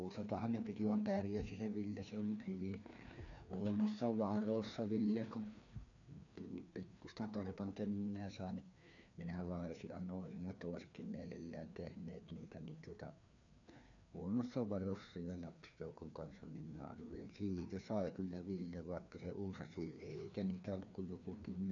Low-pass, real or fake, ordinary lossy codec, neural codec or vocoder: 7.2 kHz; fake; none; codec, 16 kHz, 2 kbps, FreqCodec, larger model